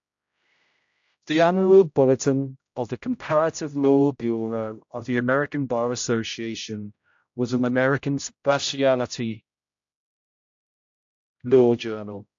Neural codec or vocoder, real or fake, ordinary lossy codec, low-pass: codec, 16 kHz, 0.5 kbps, X-Codec, HuBERT features, trained on general audio; fake; AAC, 64 kbps; 7.2 kHz